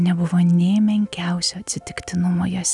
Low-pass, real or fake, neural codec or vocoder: 10.8 kHz; real; none